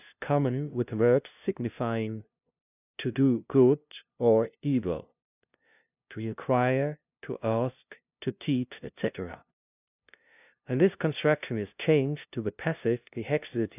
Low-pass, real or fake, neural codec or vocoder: 3.6 kHz; fake; codec, 16 kHz, 0.5 kbps, FunCodec, trained on LibriTTS, 25 frames a second